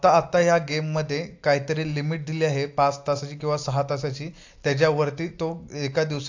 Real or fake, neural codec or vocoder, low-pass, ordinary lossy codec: real; none; 7.2 kHz; AAC, 48 kbps